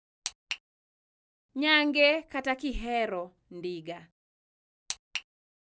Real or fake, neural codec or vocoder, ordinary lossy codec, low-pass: real; none; none; none